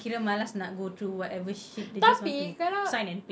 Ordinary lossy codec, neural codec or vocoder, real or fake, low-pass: none; none; real; none